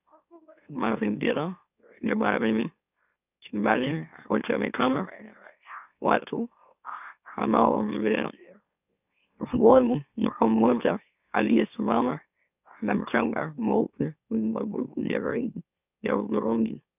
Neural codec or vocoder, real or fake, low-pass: autoencoder, 44.1 kHz, a latent of 192 numbers a frame, MeloTTS; fake; 3.6 kHz